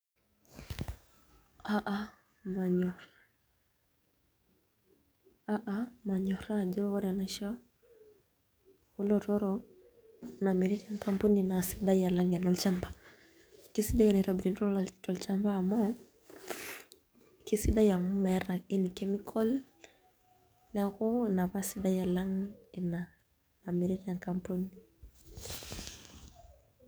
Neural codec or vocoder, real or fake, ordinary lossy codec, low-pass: codec, 44.1 kHz, 7.8 kbps, DAC; fake; none; none